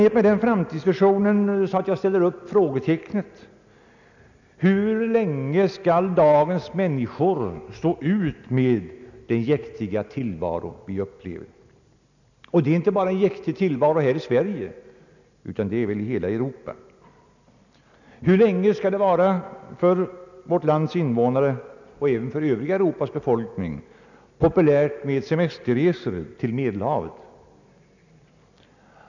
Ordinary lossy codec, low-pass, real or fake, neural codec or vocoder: none; 7.2 kHz; real; none